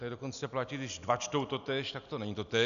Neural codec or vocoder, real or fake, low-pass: none; real; 7.2 kHz